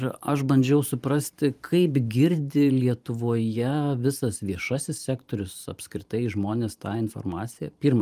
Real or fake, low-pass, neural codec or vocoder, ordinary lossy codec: fake; 14.4 kHz; vocoder, 44.1 kHz, 128 mel bands every 512 samples, BigVGAN v2; Opus, 32 kbps